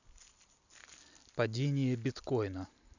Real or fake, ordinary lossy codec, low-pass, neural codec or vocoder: real; none; 7.2 kHz; none